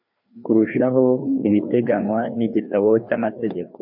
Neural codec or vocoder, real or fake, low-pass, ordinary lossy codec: codec, 16 kHz, 2 kbps, FreqCodec, larger model; fake; 5.4 kHz; AAC, 48 kbps